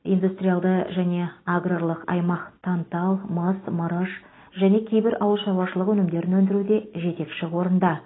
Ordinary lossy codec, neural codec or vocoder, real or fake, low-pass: AAC, 16 kbps; none; real; 7.2 kHz